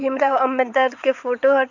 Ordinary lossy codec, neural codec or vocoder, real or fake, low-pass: none; vocoder, 22.05 kHz, 80 mel bands, WaveNeXt; fake; 7.2 kHz